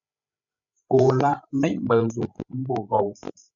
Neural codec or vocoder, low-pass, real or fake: codec, 16 kHz, 16 kbps, FreqCodec, larger model; 7.2 kHz; fake